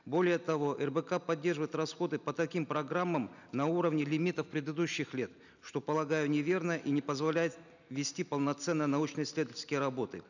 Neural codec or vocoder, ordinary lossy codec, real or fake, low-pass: none; none; real; 7.2 kHz